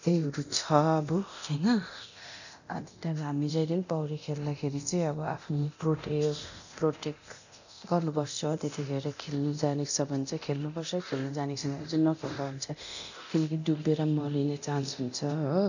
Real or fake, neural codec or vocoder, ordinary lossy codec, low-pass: fake; codec, 24 kHz, 0.9 kbps, DualCodec; none; 7.2 kHz